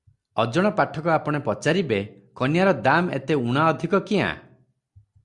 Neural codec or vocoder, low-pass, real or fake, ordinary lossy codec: none; 10.8 kHz; real; Opus, 64 kbps